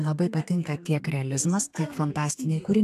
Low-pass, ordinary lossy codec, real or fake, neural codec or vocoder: 14.4 kHz; AAC, 96 kbps; fake; codec, 32 kHz, 1.9 kbps, SNAC